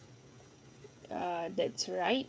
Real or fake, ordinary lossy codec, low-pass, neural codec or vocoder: fake; none; none; codec, 16 kHz, 16 kbps, FreqCodec, smaller model